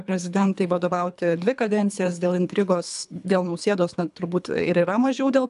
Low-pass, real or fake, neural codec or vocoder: 10.8 kHz; fake; codec, 24 kHz, 3 kbps, HILCodec